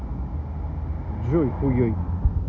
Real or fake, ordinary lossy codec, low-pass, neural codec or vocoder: real; none; 7.2 kHz; none